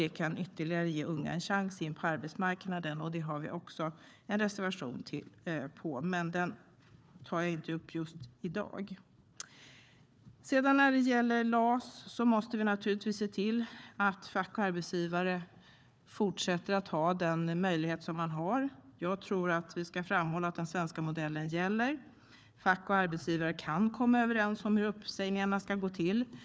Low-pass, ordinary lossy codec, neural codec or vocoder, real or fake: none; none; codec, 16 kHz, 4 kbps, FunCodec, trained on Chinese and English, 50 frames a second; fake